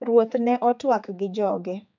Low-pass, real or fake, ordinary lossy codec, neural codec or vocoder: 7.2 kHz; fake; none; codec, 16 kHz, 4 kbps, X-Codec, HuBERT features, trained on general audio